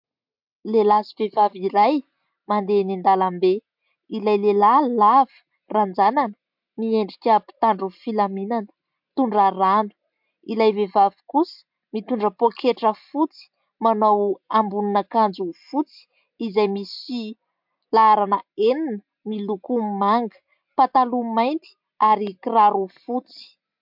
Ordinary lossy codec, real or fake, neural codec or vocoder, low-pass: MP3, 48 kbps; real; none; 5.4 kHz